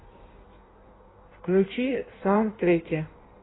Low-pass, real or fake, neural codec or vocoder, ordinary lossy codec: 7.2 kHz; fake; codec, 16 kHz in and 24 kHz out, 1.1 kbps, FireRedTTS-2 codec; AAC, 16 kbps